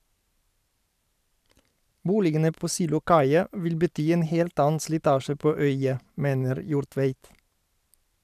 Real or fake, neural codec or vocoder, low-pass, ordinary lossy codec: fake; vocoder, 44.1 kHz, 128 mel bands every 512 samples, BigVGAN v2; 14.4 kHz; none